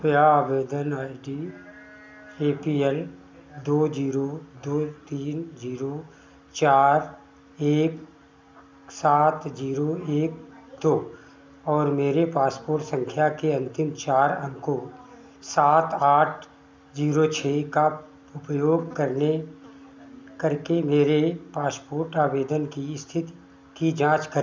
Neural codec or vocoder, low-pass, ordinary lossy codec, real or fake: none; none; none; real